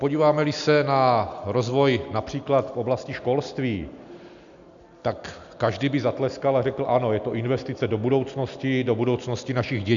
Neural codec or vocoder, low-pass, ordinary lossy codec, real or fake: none; 7.2 kHz; MP3, 96 kbps; real